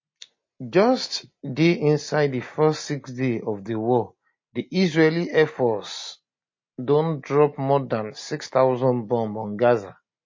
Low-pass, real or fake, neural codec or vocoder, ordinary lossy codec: 7.2 kHz; real; none; MP3, 32 kbps